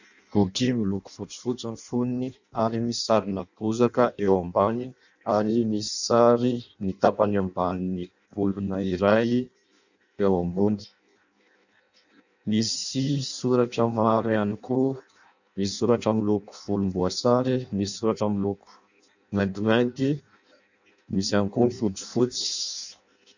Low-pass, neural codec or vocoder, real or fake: 7.2 kHz; codec, 16 kHz in and 24 kHz out, 0.6 kbps, FireRedTTS-2 codec; fake